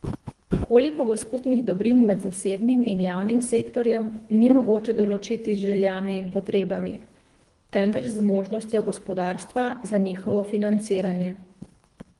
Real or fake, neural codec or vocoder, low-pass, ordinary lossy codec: fake; codec, 24 kHz, 1.5 kbps, HILCodec; 10.8 kHz; Opus, 24 kbps